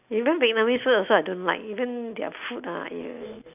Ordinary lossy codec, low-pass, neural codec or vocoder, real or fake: none; 3.6 kHz; none; real